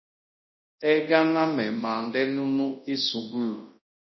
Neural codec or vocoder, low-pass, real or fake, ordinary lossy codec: codec, 24 kHz, 0.9 kbps, WavTokenizer, large speech release; 7.2 kHz; fake; MP3, 24 kbps